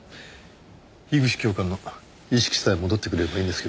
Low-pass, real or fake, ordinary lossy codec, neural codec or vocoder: none; real; none; none